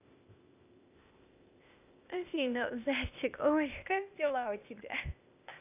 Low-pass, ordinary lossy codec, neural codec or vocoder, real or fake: 3.6 kHz; none; codec, 16 kHz, 0.8 kbps, ZipCodec; fake